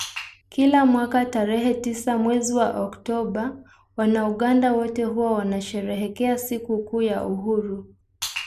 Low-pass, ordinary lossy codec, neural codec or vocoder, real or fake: 14.4 kHz; none; none; real